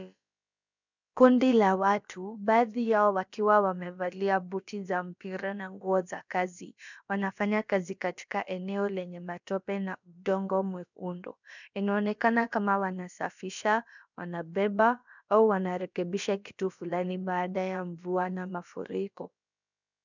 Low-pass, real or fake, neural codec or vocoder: 7.2 kHz; fake; codec, 16 kHz, about 1 kbps, DyCAST, with the encoder's durations